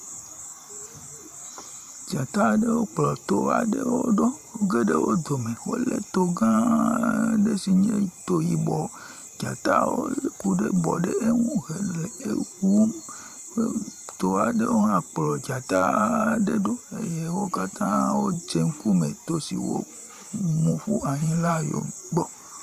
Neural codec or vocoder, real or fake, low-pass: vocoder, 44.1 kHz, 128 mel bands every 512 samples, BigVGAN v2; fake; 14.4 kHz